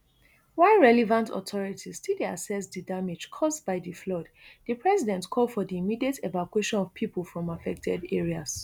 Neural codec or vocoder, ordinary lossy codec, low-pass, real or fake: none; none; none; real